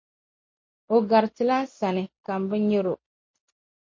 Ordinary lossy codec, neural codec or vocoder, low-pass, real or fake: MP3, 32 kbps; none; 7.2 kHz; real